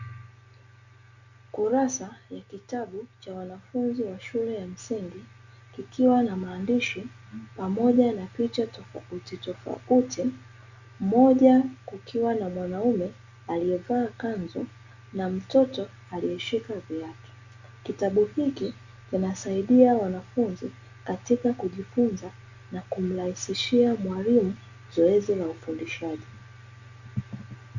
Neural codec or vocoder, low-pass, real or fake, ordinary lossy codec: none; 7.2 kHz; real; Opus, 64 kbps